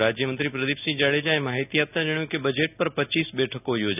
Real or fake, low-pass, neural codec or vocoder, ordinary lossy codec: real; 3.6 kHz; none; none